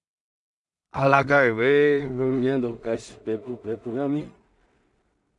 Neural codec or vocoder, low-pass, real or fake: codec, 16 kHz in and 24 kHz out, 0.4 kbps, LongCat-Audio-Codec, two codebook decoder; 10.8 kHz; fake